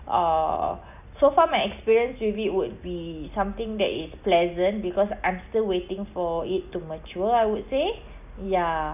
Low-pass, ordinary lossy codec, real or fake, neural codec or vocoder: 3.6 kHz; none; real; none